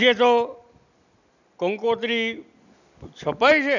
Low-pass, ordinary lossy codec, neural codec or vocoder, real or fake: 7.2 kHz; none; none; real